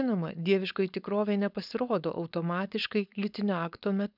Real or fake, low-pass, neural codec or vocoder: fake; 5.4 kHz; codec, 16 kHz, 4.8 kbps, FACodec